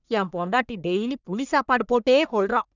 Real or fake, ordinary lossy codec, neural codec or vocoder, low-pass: fake; none; codec, 44.1 kHz, 1.7 kbps, Pupu-Codec; 7.2 kHz